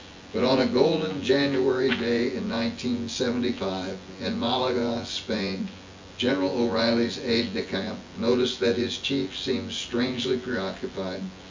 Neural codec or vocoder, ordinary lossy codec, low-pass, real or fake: vocoder, 24 kHz, 100 mel bands, Vocos; MP3, 64 kbps; 7.2 kHz; fake